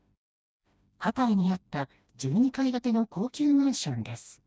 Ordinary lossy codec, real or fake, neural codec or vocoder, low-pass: none; fake; codec, 16 kHz, 1 kbps, FreqCodec, smaller model; none